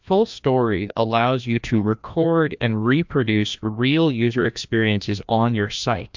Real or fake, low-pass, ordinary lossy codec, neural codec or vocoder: fake; 7.2 kHz; MP3, 64 kbps; codec, 16 kHz, 1 kbps, FreqCodec, larger model